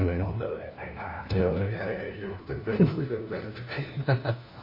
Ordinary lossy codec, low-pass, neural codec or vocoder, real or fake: MP3, 24 kbps; 5.4 kHz; codec, 16 kHz, 1 kbps, FunCodec, trained on LibriTTS, 50 frames a second; fake